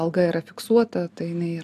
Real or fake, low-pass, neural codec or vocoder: real; 14.4 kHz; none